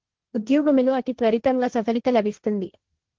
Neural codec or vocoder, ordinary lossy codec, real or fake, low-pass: codec, 16 kHz, 1.1 kbps, Voila-Tokenizer; Opus, 16 kbps; fake; 7.2 kHz